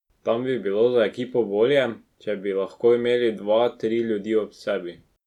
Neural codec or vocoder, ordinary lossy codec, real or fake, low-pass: none; none; real; 19.8 kHz